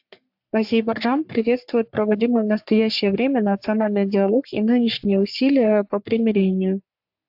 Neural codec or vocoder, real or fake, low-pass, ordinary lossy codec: codec, 44.1 kHz, 3.4 kbps, Pupu-Codec; fake; 5.4 kHz; AAC, 48 kbps